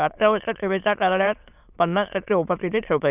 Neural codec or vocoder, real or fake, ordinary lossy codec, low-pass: autoencoder, 22.05 kHz, a latent of 192 numbers a frame, VITS, trained on many speakers; fake; none; 3.6 kHz